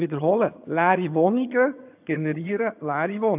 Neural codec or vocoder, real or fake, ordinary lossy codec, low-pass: vocoder, 22.05 kHz, 80 mel bands, HiFi-GAN; fake; AAC, 32 kbps; 3.6 kHz